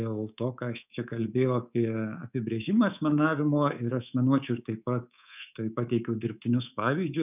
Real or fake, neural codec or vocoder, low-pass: fake; codec, 24 kHz, 3.1 kbps, DualCodec; 3.6 kHz